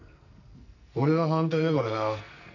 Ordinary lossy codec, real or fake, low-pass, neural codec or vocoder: none; fake; 7.2 kHz; codec, 44.1 kHz, 2.6 kbps, SNAC